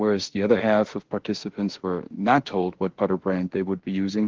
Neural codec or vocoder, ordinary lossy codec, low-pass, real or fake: autoencoder, 48 kHz, 32 numbers a frame, DAC-VAE, trained on Japanese speech; Opus, 16 kbps; 7.2 kHz; fake